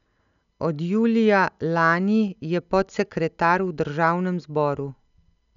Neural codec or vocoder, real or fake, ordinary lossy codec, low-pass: none; real; none; 7.2 kHz